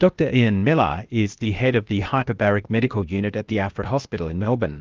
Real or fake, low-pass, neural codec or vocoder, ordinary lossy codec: fake; 7.2 kHz; codec, 16 kHz, 0.8 kbps, ZipCodec; Opus, 32 kbps